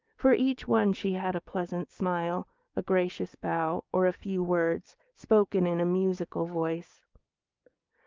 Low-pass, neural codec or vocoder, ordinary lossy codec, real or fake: 7.2 kHz; codec, 16 kHz, 6 kbps, DAC; Opus, 24 kbps; fake